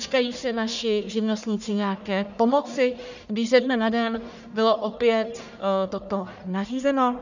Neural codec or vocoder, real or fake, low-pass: codec, 44.1 kHz, 1.7 kbps, Pupu-Codec; fake; 7.2 kHz